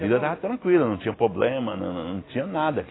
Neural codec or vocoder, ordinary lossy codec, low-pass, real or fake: none; AAC, 16 kbps; 7.2 kHz; real